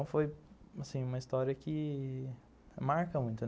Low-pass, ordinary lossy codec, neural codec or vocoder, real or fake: none; none; none; real